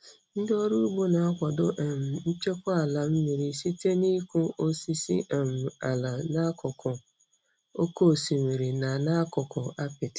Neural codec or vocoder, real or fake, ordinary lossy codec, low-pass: none; real; none; none